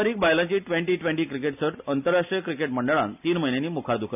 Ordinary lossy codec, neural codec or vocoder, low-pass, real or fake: none; none; 3.6 kHz; real